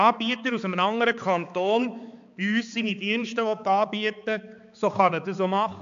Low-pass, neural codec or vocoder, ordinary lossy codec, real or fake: 7.2 kHz; codec, 16 kHz, 4 kbps, X-Codec, HuBERT features, trained on balanced general audio; AAC, 64 kbps; fake